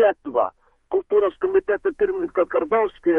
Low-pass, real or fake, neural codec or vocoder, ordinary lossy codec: 14.4 kHz; fake; codec, 32 kHz, 1.9 kbps, SNAC; MP3, 48 kbps